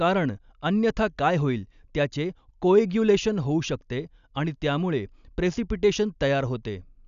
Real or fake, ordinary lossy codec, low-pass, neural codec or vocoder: real; none; 7.2 kHz; none